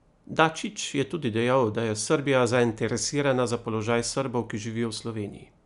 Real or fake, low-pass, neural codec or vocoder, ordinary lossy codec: real; 10.8 kHz; none; none